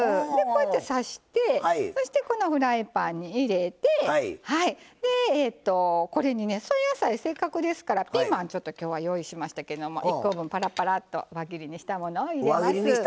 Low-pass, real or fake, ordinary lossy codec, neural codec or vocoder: none; real; none; none